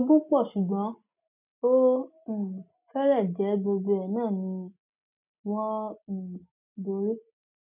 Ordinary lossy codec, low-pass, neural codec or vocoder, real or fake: none; 3.6 kHz; none; real